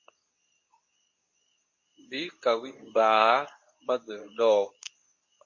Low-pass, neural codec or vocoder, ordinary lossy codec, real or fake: 7.2 kHz; codec, 24 kHz, 0.9 kbps, WavTokenizer, medium speech release version 2; MP3, 32 kbps; fake